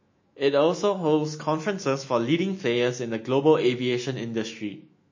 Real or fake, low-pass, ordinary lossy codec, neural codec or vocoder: fake; 7.2 kHz; MP3, 32 kbps; autoencoder, 48 kHz, 128 numbers a frame, DAC-VAE, trained on Japanese speech